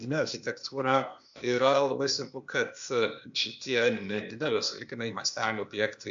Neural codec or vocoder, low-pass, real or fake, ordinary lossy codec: codec, 16 kHz, 0.8 kbps, ZipCodec; 7.2 kHz; fake; MP3, 64 kbps